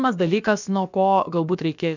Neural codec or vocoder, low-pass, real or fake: codec, 16 kHz, about 1 kbps, DyCAST, with the encoder's durations; 7.2 kHz; fake